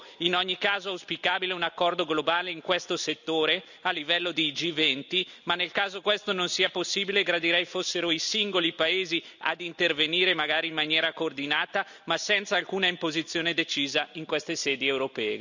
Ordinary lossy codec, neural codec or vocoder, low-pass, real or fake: none; none; 7.2 kHz; real